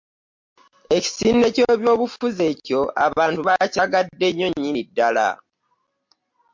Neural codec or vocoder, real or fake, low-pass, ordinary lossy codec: none; real; 7.2 kHz; MP3, 48 kbps